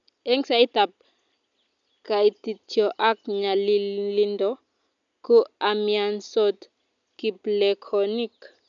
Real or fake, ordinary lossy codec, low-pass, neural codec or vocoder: real; none; 7.2 kHz; none